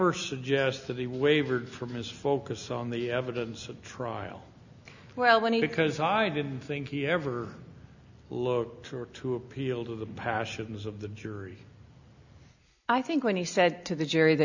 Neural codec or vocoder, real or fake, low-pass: none; real; 7.2 kHz